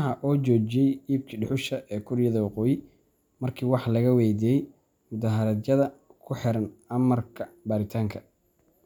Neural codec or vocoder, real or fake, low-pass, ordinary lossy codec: none; real; 19.8 kHz; none